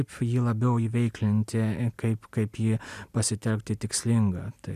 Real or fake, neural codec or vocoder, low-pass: real; none; 14.4 kHz